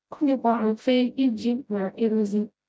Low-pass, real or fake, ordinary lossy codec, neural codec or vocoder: none; fake; none; codec, 16 kHz, 0.5 kbps, FreqCodec, smaller model